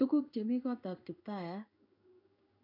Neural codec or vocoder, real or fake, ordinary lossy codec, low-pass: codec, 16 kHz in and 24 kHz out, 1 kbps, XY-Tokenizer; fake; none; 5.4 kHz